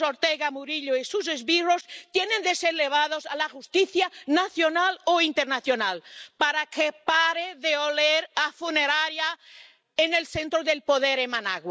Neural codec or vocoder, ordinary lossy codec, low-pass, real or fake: none; none; none; real